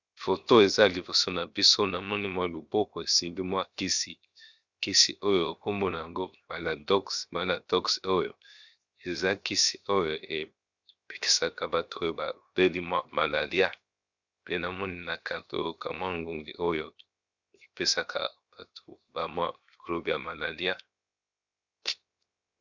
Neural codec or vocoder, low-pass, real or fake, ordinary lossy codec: codec, 16 kHz, 0.7 kbps, FocalCodec; 7.2 kHz; fake; Opus, 64 kbps